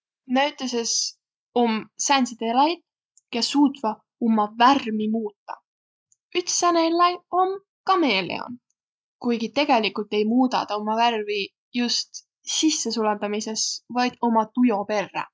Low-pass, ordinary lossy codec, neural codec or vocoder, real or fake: none; none; none; real